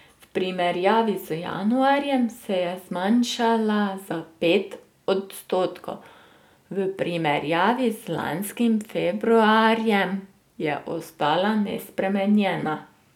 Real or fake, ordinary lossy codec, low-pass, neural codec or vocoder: real; none; 19.8 kHz; none